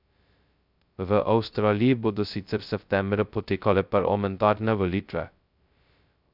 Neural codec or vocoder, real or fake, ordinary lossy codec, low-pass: codec, 16 kHz, 0.2 kbps, FocalCodec; fake; none; 5.4 kHz